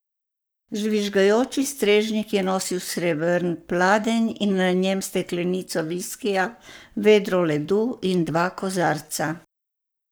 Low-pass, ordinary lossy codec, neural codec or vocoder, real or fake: none; none; codec, 44.1 kHz, 7.8 kbps, Pupu-Codec; fake